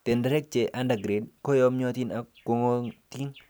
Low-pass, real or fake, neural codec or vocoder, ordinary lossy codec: none; real; none; none